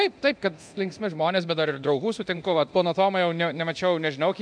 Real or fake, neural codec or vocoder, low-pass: fake; codec, 24 kHz, 0.9 kbps, DualCodec; 9.9 kHz